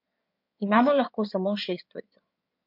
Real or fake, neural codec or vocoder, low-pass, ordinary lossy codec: fake; codec, 16 kHz in and 24 kHz out, 2.2 kbps, FireRedTTS-2 codec; 5.4 kHz; MP3, 48 kbps